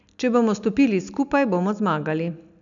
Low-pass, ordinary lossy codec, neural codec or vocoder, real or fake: 7.2 kHz; none; none; real